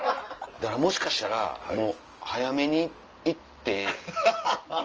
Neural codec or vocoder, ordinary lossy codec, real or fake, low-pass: none; Opus, 24 kbps; real; 7.2 kHz